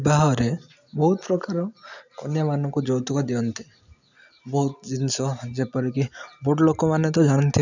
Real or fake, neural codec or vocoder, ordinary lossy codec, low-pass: real; none; none; 7.2 kHz